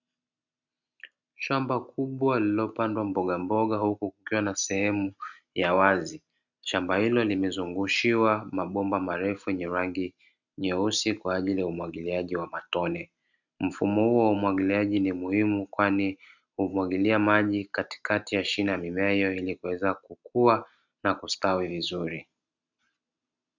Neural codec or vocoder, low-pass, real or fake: none; 7.2 kHz; real